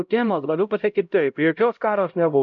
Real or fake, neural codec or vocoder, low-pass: fake; codec, 16 kHz, 0.5 kbps, X-Codec, HuBERT features, trained on LibriSpeech; 7.2 kHz